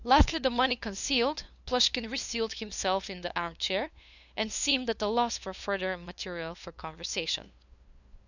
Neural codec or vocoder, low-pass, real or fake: codec, 16 kHz, 2 kbps, FunCodec, trained on LibriTTS, 25 frames a second; 7.2 kHz; fake